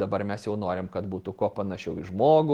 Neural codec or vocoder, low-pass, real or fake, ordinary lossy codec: none; 19.8 kHz; real; Opus, 16 kbps